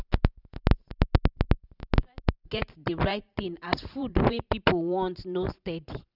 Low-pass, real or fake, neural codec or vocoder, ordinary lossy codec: 5.4 kHz; fake; vocoder, 44.1 kHz, 128 mel bands every 512 samples, BigVGAN v2; none